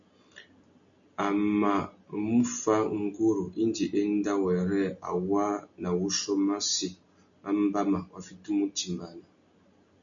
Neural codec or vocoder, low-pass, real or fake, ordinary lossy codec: none; 7.2 kHz; real; MP3, 64 kbps